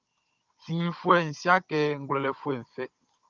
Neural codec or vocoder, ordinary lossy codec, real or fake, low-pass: codec, 16 kHz, 16 kbps, FunCodec, trained on Chinese and English, 50 frames a second; Opus, 24 kbps; fake; 7.2 kHz